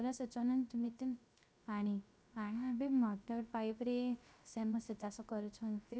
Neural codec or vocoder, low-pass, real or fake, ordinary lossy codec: codec, 16 kHz, 0.3 kbps, FocalCodec; none; fake; none